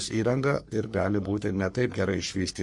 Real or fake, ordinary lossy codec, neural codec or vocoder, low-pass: fake; MP3, 48 kbps; codec, 44.1 kHz, 3.4 kbps, Pupu-Codec; 10.8 kHz